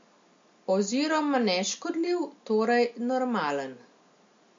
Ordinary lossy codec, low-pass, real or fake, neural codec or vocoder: MP3, 48 kbps; 7.2 kHz; real; none